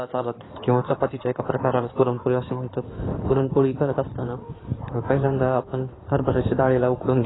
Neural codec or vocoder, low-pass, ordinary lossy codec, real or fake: codec, 16 kHz in and 24 kHz out, 2.2 kbps, FireRedTTS-2 codec; 7.2 kHz; AAC, 16 kbps; fake